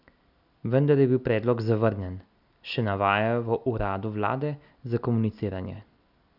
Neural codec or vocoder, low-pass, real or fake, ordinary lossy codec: none; 5.4 kHz; real; none